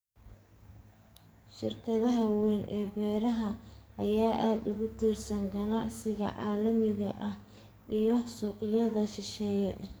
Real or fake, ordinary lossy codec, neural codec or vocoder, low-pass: fake; none; codec, 44.1 kHz, 2.6 kbps, SNAC; none